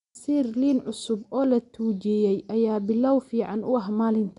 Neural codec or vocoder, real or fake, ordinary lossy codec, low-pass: none; real; none; 10.8 kHz